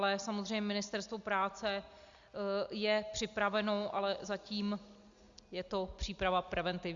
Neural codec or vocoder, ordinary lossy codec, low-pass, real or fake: none; Opus, 64 kbps; 7.2 kHz; real